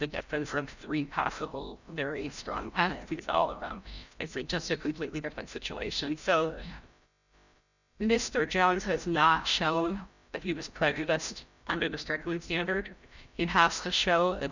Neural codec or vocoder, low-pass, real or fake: codec, 16 kHz, 0.5 kbps, FreqCodec, larger model; 7.2 kHz; fake